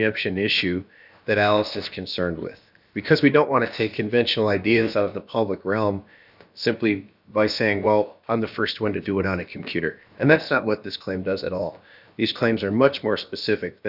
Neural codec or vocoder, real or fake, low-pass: codec, 16 kHz, about 1 kbps, DyCAST, with the encoder's durations; fake; 5.4 kHz